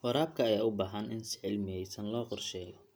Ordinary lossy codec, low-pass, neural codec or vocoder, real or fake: none; none; none; real